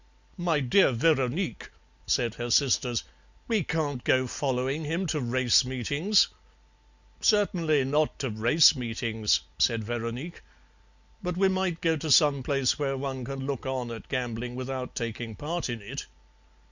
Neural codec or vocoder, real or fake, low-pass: none; real; 7.2 kHz